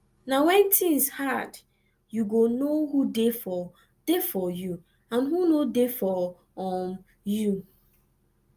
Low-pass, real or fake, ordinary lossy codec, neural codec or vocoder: 14.4 kHz; real; Opus, 32 kbps; none